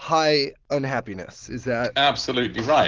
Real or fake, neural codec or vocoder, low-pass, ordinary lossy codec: real; none; 7.2 kHz; Opus, 16 kbps